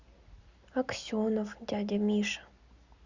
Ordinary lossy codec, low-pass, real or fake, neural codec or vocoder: Opus, 64 kbps; 7.2 kHz; real; none